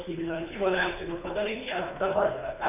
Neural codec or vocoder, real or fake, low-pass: codec, 24 kHz, 3 kbps, HILCodec; fake; 3.6 kHz